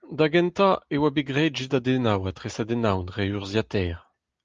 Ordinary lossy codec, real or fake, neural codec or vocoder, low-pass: Opus, 32 kbps; real; none; 7.2 kHz